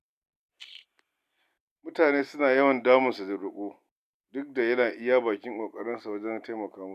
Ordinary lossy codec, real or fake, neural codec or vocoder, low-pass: none; real; none; 14.4 kHz